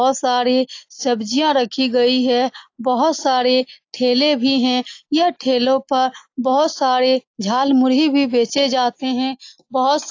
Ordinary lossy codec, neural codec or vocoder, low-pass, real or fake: AAC, 48 kbps; none; 7.2 kHz; real